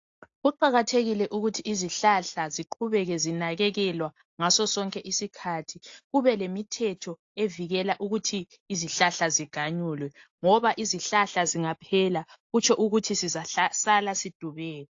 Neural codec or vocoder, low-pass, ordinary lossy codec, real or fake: none; 7.2 kHz; AAC, 48 kbps; real